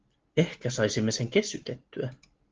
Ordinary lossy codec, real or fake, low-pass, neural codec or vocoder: Opus, 16 kbps; real; 7.2 kHz; none